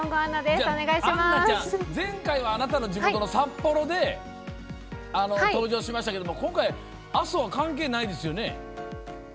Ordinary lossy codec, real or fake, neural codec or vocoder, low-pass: none; real; none; none